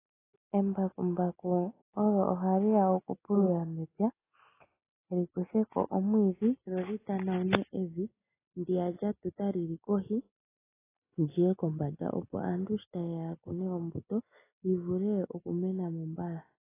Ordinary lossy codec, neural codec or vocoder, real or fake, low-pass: AAC, 16 kbps; none; real; 3.6 kHz